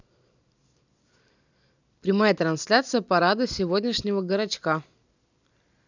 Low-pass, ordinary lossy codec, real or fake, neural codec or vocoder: 7.2 kHz; none; real; none